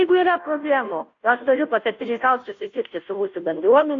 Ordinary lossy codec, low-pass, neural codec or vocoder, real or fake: AAC, 48 kbps; 7.2 kHz; codec, 16 kHz, 0.5 kbps, FunCodec, trained on Chinese and English, 25 frames a second; fake